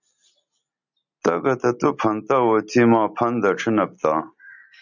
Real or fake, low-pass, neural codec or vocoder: real; 7.2 kHz; none